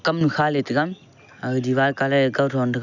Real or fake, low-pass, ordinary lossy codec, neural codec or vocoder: real; 7.2 kHz; none; none